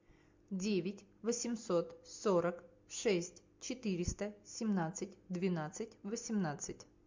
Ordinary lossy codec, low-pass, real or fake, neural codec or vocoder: MP3, 48 kbps; 7.2 kHz; real; none